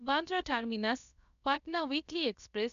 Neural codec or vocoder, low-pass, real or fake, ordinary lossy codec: codec, 16 kHz, about 1 kbps, DyCAST, with the encoder's durations; 7.2 kHz; fake; none